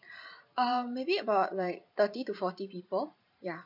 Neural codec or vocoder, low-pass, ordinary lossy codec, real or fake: vocoder, 44.1 kHz, 128 mel bands every 512 samples, BigVGAN v2; 5.4 kHz; none; fake